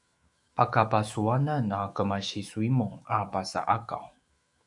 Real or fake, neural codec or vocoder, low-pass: fake; autoencoder, 48 kHz, 128 numbers a frame, DAC-VAE, trained on Japanese speech; 10.8 kHz